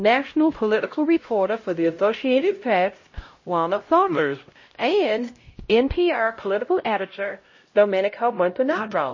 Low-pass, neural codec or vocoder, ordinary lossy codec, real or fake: 7.2 kHz; codec, 16 kHz, 0.5 kbps, X-Codec, HuBERT features, trained on LibriSpeech; MP3, 32 kbps; fake